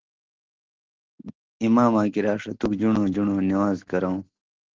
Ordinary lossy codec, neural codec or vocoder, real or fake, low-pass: Opus, 16 kbps; none; real; 7.2 kHz